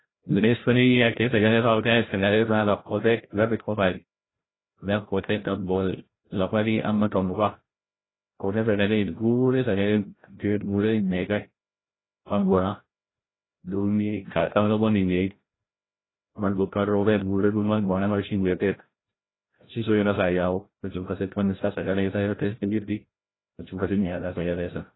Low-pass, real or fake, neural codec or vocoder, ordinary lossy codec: 7.2 kHz; fake; codec, 16 kHz, 0.5 kbps, FreqCodec, larger model; AAC, 16 kbps